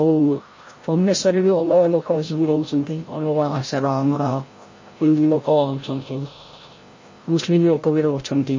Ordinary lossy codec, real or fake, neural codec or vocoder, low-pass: MP3, 32 kbps; fake; codec, 16 kHz, 0.5 kbps, FreqCodec, larger model; 7.2 kHz